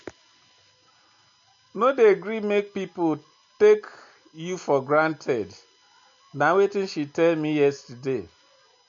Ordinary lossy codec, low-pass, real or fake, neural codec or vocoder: MP3, 48 kbps; 7.2 kHz; real; none